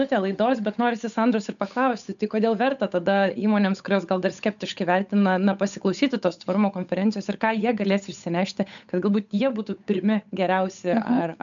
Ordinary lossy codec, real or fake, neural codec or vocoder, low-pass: MP3, 96 kbps; fake; codec, 16 kHz, 8 kbps, FunCodec, trained on Chinese and English, 25 frames a second; 7.2 kHz